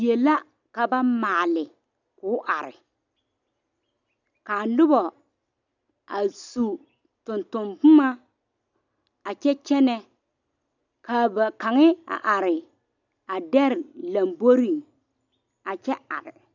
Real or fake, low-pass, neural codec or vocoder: real; 7.2 kHz; none